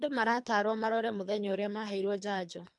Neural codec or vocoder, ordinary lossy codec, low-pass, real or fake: codec, 24 kHz, 3 kbps, HILCodec; MP3, 64 kbps; 10.8 kHz; fake